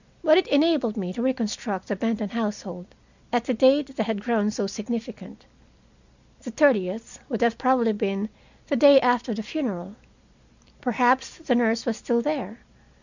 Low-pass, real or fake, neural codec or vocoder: 7.2 kHz; real; none